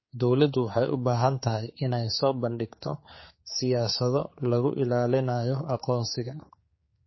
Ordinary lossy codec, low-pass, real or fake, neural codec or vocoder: MP3, 24 kbps; 7.2 kHz; fake; codec, 16 kHz, 4 kbps, X-Codec, HuBERT features, trained on general audio